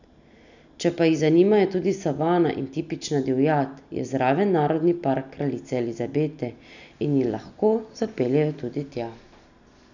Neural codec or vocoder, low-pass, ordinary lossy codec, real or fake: none; 7.2 kHz; none; real